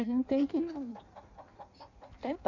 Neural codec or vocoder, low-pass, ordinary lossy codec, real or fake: codec, 16 kHz in and 24 kHz out, 1.1 kbps, FireRedTTS-2 codec; 7.2 kHz; none; fake